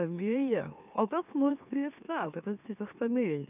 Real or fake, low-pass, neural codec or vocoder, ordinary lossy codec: fake; 3.6 kHz; autoencoder, 44.1 kHz, a latent of 192 numbers a frame, MeloTTS; none